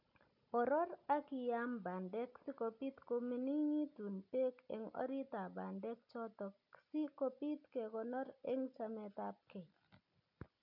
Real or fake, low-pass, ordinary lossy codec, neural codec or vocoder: real; 5.4 kHz; none; none